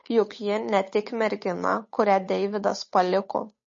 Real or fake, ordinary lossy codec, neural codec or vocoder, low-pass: fake; MP3, 32 kbps; codec, 16 kHz, 8 kbps, FunCodec, trained on Chinese and English, 25 frames a second; 7.2 kHz